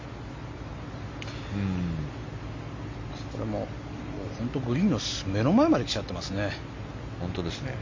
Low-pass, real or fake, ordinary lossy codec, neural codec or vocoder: 7.2 kHz; real; MP3, 48 kbps; none